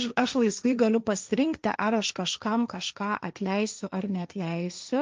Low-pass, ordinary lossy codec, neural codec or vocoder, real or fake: 7.2 kHz; Opus, 24 kbps; codec, 16 kHz, 1.1 kbps, Voila-Tokenizer; fake